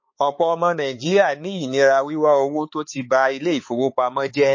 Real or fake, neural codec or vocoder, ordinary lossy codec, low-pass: fake; codec, 16 kHz, 4 kbps, X-Codec, HuBERT features, trained on balanced general audio; MP3, 32 kbps; 7.2 kHz